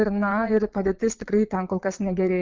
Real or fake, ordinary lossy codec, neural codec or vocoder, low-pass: fake; Opus, 24 kbps; vocoder, 22.05 kHz, 80 mel bands, Vocos; 7.2 kHz